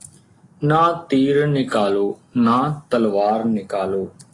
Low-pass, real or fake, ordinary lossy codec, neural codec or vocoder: 10.8 kHz; real; AAC, 32 kbps; none